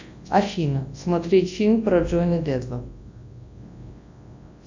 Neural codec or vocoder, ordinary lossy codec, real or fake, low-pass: codec, 24 kHz, 0.9 kbps, WavTokenizer, large speech release; AAC, 48 kbps; fake; 7.2 kHz